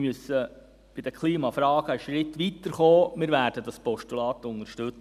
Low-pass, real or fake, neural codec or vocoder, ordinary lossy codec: 14.4 kHz; real; none; none